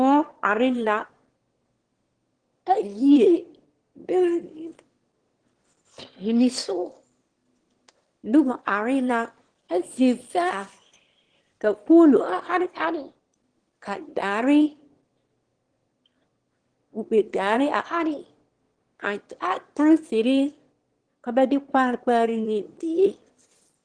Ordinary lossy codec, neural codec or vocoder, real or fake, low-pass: Opus, 16 kbps; autoencoder, 22.05 kHz, a latent of 192 numbers a frame, VITS, trained on one speaker; fake; 9.9 kHz